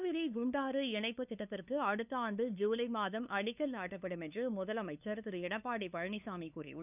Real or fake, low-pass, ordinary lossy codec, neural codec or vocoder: fake; 3.6 kHz; none; codec, 16 kHz, 2 kbps, FunCodec, trained on LibriTTS, 25 frames a second